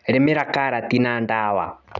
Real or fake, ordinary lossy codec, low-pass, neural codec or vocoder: real; none; 7.2 kHz; none